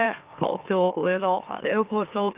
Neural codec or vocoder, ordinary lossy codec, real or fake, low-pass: autoencoder, 44.1 kHz, a latent of 192 numbers a frame, MeloTTS; Opus, 24 kbps; fake; 3.6 kHz